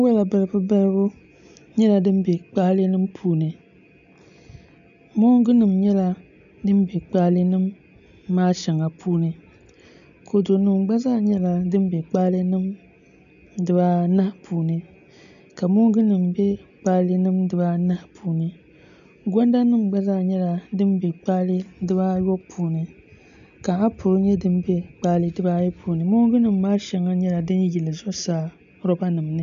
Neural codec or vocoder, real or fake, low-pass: none; real; 7.2 kHz